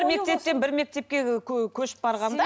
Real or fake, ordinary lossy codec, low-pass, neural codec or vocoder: real; none; none; none